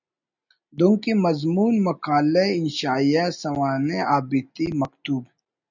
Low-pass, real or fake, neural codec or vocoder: 7.2 kHz; real; none